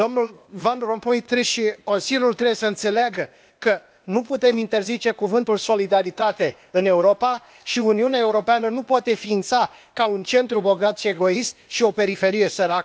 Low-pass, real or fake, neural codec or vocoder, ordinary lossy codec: none; fake; codec, 16 kHz, 0.8 kbps, ZipCodec; none